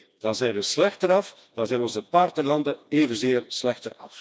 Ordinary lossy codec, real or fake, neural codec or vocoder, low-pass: none; fake; codec, 16 kHz, 2 kbps, FreqCodec, smaller model; none